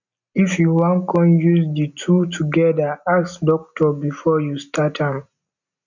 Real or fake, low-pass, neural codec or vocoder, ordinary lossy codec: real; 7.2 kHz; none; none